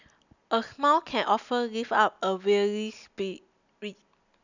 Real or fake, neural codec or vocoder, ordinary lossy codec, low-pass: real; none; none; 7.2 kHz